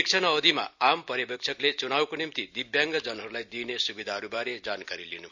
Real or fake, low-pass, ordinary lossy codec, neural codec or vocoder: real; 7.2 kHz; none; none